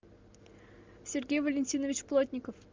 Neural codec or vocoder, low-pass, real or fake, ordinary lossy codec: none; 7.2 kHz; real; Opus, 32 kbps